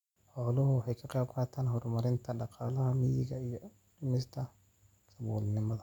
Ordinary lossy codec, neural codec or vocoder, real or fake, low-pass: none; none; real; 19.8 kHz